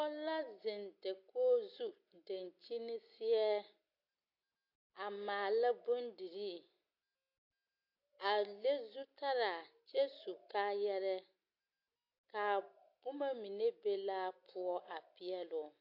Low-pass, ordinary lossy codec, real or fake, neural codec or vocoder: 5.4 kHz; AAC, 48 kbps; real; none